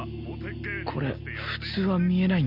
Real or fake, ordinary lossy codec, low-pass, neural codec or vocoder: real; none; 5.4 kHz; none